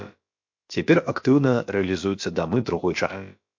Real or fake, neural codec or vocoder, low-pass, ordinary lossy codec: fake; codec, 16 kHz, about 1 kbps, DyCAST, with the encoder's durations; 7.2 kHz; MP3, 48 kbps